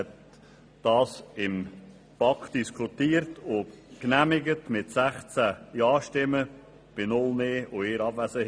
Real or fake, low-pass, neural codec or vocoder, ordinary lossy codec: real; none; none; none